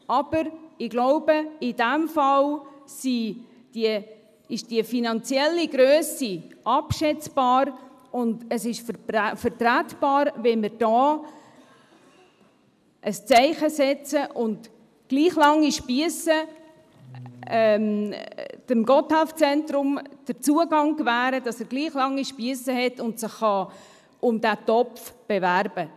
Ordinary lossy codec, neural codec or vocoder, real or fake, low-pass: none; none; real; 14.4 kHz